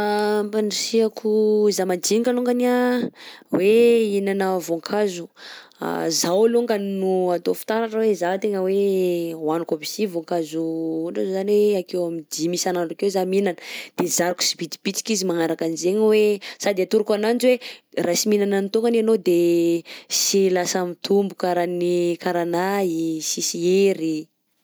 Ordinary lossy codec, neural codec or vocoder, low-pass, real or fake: none; none; none; real